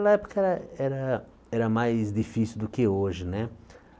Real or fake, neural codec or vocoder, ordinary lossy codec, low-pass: real; none; none; none